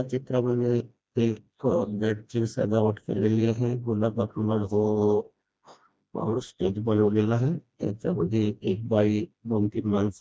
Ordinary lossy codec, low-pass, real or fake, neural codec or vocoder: none; none; fake; codec, 16 kHz, 1 kbps, FreqCodec, smaller model